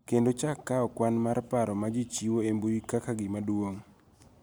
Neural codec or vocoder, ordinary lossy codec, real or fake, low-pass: none; none; real; none